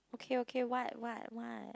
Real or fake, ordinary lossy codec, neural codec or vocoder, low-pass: real; none; none; none